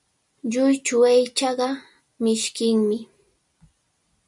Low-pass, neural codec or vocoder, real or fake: 10.8 kHz; none; real